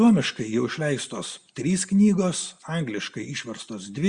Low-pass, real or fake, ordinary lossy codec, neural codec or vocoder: 9.9 kHz; real; Opus, 64 kbps; none